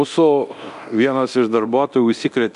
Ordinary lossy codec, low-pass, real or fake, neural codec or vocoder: AAC, 96 kbps; 10.8 kHz; fake; codec, 24 kHz, 0.9 kbps, DualCodec